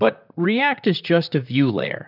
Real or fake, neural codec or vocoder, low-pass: fake; vocoder, 44.1 kHz, 128 mel bands, Pupu-Vocoder; 5.4 kHz